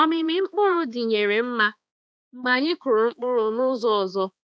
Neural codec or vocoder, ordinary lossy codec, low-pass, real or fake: codec, 16 kHz, 2 kbps, X-Codec, HuBERT features, trained on balanced general audio; none; none; fake